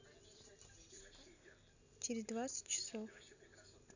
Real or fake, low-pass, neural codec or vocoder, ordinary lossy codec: real; 7.2 kHz; none; none